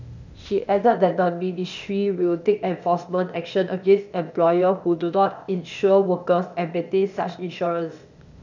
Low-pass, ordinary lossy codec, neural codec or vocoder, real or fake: 7.2 kHz; none; codec, 16 kHz, 0.8 kbps, ZipCodec; fake